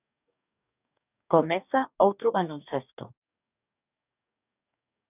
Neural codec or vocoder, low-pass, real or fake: codec, 44.1 kHz, 2.6 kbps, DAC; 3.6 kHz; fake